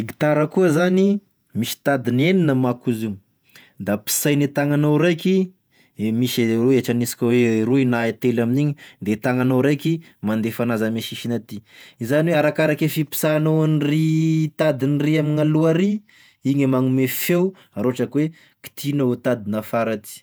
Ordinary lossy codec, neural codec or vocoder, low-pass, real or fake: none; vocoder, 48 kHz, 128 mel bands, Vocos; none; fake